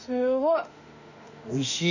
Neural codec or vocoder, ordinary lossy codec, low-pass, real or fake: autoencoder, 48 kHz, 32 numbers a frame, DAC-VAE, trained on Japanese speech; none; 7.2 kHz; fake